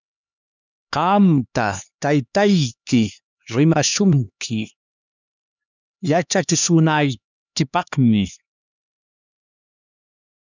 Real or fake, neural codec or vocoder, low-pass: fake; codec, 16 kHz, 2 kbps, X-Codec, HuBERT features, trained on LibriSpeech; 7.2 kHz